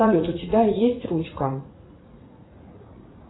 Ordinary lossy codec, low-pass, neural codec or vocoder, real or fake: AAC, 16 kbps; 7.2 kHz; vocoder, 44.1 kHz, 80 mel bands, Vocos; fake